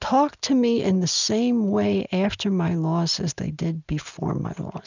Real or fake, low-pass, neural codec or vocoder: real; 7.2 kHz; none